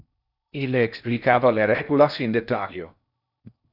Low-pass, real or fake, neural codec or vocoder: 5.4 kHz; fake; codec, 16 kHz in and 24 kHz out, 0.6 kbps, FocalCodec, streaming, 4096 codes